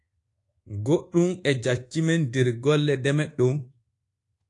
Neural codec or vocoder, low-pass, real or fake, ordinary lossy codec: codec, 24 kHz, 1.2 kbps, DualCodec; 10.8 kHz; fake; AAC, 48 kbps